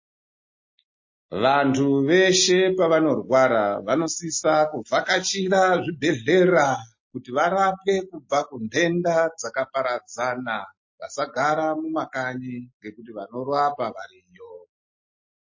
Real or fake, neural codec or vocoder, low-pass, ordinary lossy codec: real; none; 7.2 kHz; MP3, 32 kbps